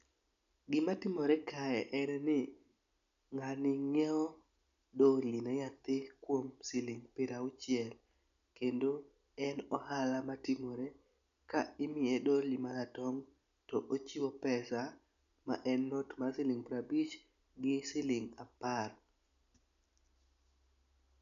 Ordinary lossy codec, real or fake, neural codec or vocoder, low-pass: none; real; none; 7.2 kHz